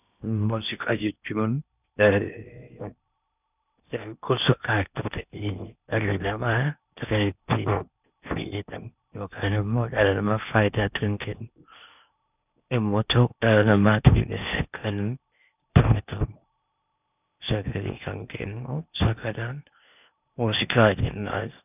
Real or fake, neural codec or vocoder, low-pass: fake; codec, 16 kHz in and 24 kHz out, 0.8 kbps, FocalCodec, streaming, 65536 codes; 3.6 kHz